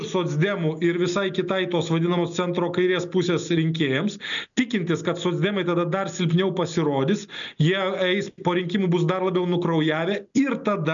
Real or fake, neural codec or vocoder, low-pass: real; none; 7.2 kHz